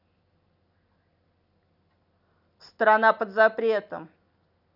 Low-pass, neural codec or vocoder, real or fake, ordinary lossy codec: 5.4 kHz; none; real; none